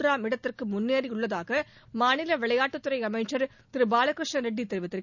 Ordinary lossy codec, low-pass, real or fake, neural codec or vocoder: none; 7.2 kHz; real; none